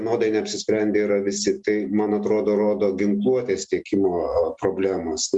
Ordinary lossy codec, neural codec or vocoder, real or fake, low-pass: Opus, 64 kbps; none; real; 10.8 kHz